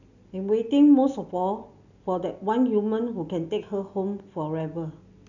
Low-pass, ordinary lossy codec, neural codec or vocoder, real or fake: 7.2 kHz; none; none; real